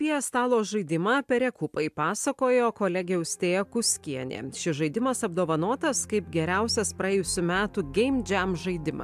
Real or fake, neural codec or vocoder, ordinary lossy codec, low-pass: real; none; AAC, 96 kbps; 14.4 kHz